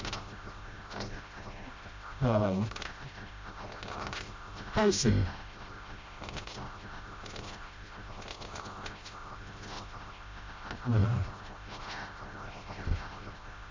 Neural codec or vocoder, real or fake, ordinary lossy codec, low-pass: codec, 16 kHz, 0.5 kbps, FreqCodec, smaller model; fake; MP3, 48 kbps; 7.2 kHz